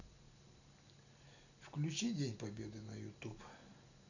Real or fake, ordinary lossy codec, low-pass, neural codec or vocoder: real; none; 7.2 kHz; none